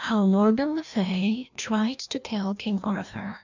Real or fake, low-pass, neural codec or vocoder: fake; 7.2 kHz; codec, 16 kHz, 1 kbps, FreqCodec, larger model